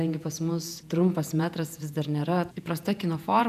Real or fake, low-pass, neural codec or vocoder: fake; 14.4 kHz; vocoder, 48 kHz, 128 mel bands, Vocos